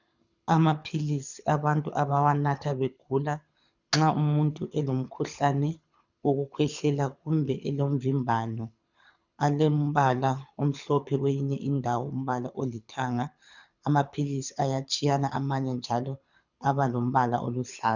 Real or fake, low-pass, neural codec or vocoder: fake; 7.2 kHz; codec, 24 kHz, 6 kbps, HILCodec